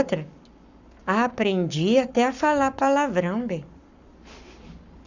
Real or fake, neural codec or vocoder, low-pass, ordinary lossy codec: fake; codec, 44.1 kHz, 7.8 kbps, Pupu-Codec; 7.2 kHz; none